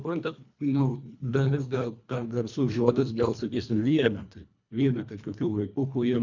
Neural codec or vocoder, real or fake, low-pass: codec, 24 kHz, 1.5 kbps, HILCodec; fake; 7.2 kHz